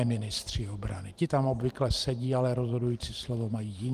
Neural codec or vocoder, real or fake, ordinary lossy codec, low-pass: vocoder, 44.1 kHz, 128 mel bands every 512 samples, BigVGAN v2; fake; Opus, 24 kbps; 14.4 kHz